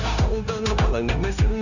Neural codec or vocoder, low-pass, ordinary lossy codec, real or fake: codec, 16 kHz, 0.9 kbps, LongCat-Audio-Codec; 7.2 kHz; none; fake